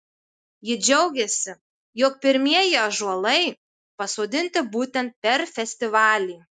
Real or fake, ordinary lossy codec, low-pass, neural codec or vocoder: real; MP3, 96 kbps; 9.9 kHz; none